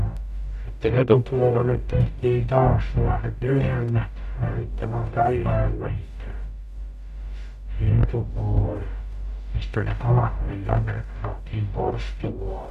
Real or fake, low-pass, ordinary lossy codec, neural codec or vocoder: fake; 14.4 kHz; none; codec, 44.1 kHz, 0.9 kbps, DAC